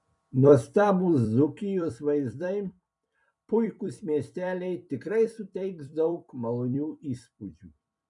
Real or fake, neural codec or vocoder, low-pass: fake; vocoder, 44.1 kHz, 128 mel bands every 256 samples, BigVGAN v2; 10.8 kHz